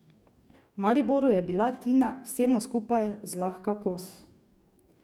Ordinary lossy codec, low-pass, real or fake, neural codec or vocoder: none; 19.8 kHz; fake; codec, 44.1 kHz, 2.6 kbps, DAC